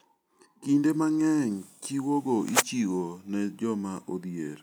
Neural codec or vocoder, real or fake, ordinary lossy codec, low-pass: none; real; none; none